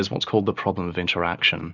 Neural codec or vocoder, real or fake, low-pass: none; real; 7.2 kHz